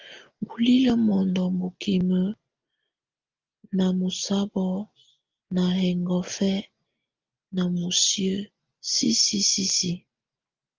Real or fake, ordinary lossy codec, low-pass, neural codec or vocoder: real; Opus, 32 kbps; 7.2 kHz; none